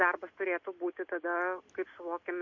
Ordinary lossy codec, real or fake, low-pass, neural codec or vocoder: MP3, 48 kbps; real; 7.2 kHz; none